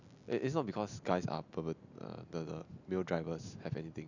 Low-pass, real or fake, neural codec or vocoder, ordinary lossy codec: 7.2 kHz; real; none; none